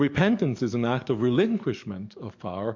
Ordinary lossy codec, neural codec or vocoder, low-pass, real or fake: MP3, 48 kbps; codec, 44.1 kHz, 7.8 kbps, DAC; 7.2 kHz; fake